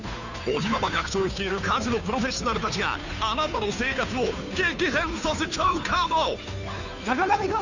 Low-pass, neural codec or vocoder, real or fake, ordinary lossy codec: 7.2 kHz; codec, 16 kHz, 2 kbps, FunCodec, trained on Chinese and English, 25 frames a second; fake; none